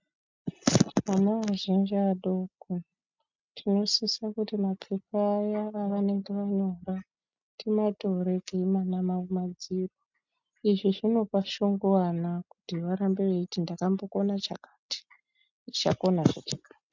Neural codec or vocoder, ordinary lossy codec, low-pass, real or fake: none; MP3, 64 kbps; 7.2 kHz; real